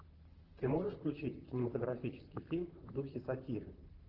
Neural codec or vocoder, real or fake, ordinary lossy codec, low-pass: vocoder, 44.1 kHz, 128 mel bands, Pupu-Vocoder; fake; Opus, 16 kbps; 5.4 kHz